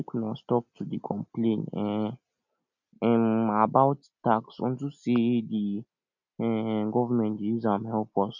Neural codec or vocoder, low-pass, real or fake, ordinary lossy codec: none; 7.2 kHz; real; none